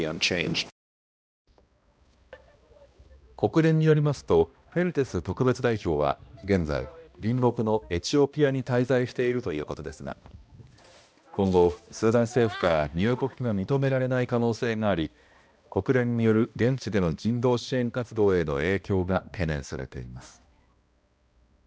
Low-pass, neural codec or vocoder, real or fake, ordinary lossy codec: none; codec, 16 kHz, 1 kbps, X-Codec, HuBERT features, trained on balanced general audio; fake; none